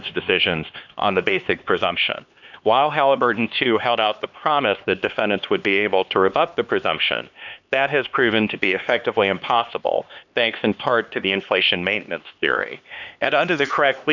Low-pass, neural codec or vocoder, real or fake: 7.2 kHz; codec, 16 kHz, 2 kbps, X-Codec, HuBERT features, trained on LibriSpeech; fake